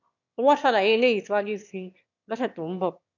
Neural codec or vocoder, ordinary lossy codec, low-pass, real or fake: autoencoder, 22.05 kHz, a latent of 192 numbers a frame, VITS, trained on one speaker; none; 7.2 kHz; fake